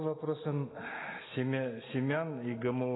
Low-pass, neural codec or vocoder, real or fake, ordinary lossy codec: 7.2 kHz; none; real; AAC, 16 kbps